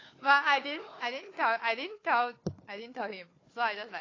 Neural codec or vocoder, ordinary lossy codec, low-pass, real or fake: codec, 16 kHz, 4 kbps, FunCodec, trained on Chinese and English, 50 frames a second; AAC, 32 kbps; 7.2 kHz; fake